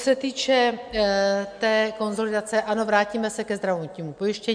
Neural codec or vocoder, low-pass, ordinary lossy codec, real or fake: none; 9.9 kHz; MP3, 64 kbps; real